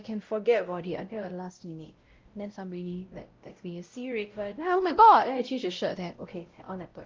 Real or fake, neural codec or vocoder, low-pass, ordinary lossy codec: fake; codec, 16 kHz, 0.5 kbps, X-Codec, WavLM features, trained on Multilingual LibriSpeech; 7.2 kHz; Opus, 24 kbps